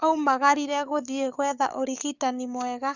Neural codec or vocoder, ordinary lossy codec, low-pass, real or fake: codec, 44.1 kHz, 7.8 kbps, DAC; none; 7.2 kHz; fake